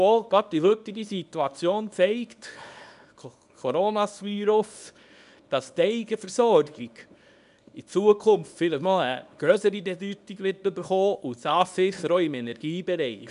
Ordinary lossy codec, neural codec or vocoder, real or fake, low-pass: none; codec, 24 kHz, 0.9 kbps, WavTokenizer, small release; fake; 10.8 kHz